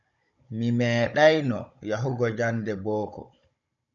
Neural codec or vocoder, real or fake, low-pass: codec, 16 kHz, 16 kbps, FunCodec, trained on Chinese and English, 50 frames a second; fake; 7.2 kHz